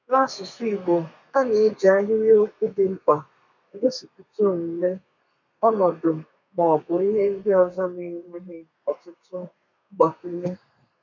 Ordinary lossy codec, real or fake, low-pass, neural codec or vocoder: none; fake; 7.2 kHz; codec, 32 kHz, 1.9 kbps, SNAC